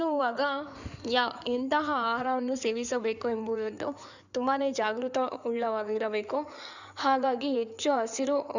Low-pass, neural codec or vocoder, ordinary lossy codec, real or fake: 7.2 kHz; codec, 16 kHz in and 24 kHz out, 2.2 kbps, FireRedTTS-2 codec; none; fake